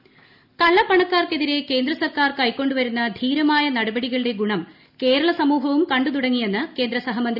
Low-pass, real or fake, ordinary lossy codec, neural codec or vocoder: 5.4 kHz; real; none; none